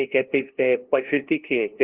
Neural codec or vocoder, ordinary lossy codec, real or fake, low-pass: codec, 16 kHz, 0.5 kbps, FunCodec, trained on LibriTTS, 25 frames a second; Opus, 16 kbps; fake; 3.6 kHz